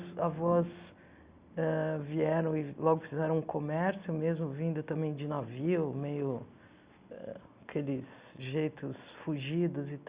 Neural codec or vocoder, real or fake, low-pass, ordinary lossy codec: none; real; 3.6 kHz; Opus, 64 kbps